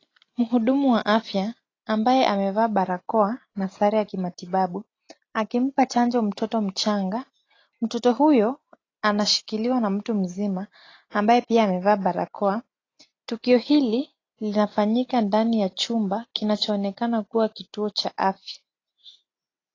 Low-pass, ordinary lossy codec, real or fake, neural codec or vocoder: 7.2 kHz; AAC, 32 kbps; real; none